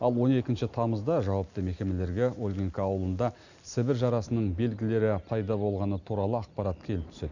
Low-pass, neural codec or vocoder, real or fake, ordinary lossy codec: 7.2 kHz; none; real; none